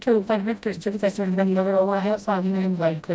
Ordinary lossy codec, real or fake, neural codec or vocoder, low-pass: none; fake; codec, 16 kHz, 0.5 kbps, FreqCodec, smaller model; none